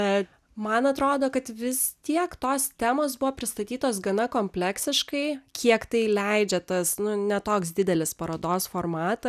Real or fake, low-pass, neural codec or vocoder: real; 14.4 kHz; none